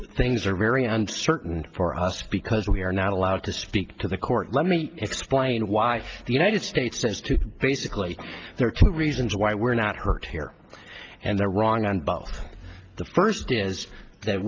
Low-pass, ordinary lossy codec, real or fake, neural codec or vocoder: 7.2 kHz; Opus, 24 kbps; real; none